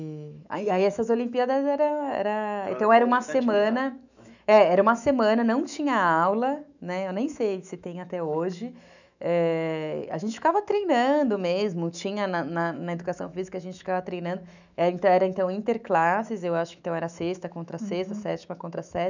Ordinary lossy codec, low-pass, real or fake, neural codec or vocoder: none; 7.2 kHz; fake; autoencoder, 48 kHz, 128 numbers a frame, DAC-VAE, trained on Japanese speech